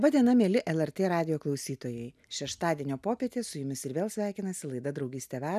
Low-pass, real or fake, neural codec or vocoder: 14.4 kHz; real; none